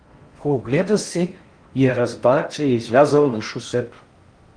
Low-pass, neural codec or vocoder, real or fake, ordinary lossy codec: 9.9 kHz; codec, 16 kHz in and 24 kHz out, 0.6 kbps, FocalCodec, streaming, 4096 codes; fake; Opus, 24 kbps